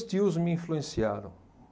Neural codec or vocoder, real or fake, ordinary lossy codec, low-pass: none; real; none; none